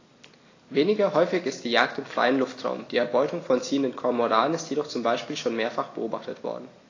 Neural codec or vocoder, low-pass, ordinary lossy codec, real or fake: none; 7.2 kHz; AAC, 32 kbps; real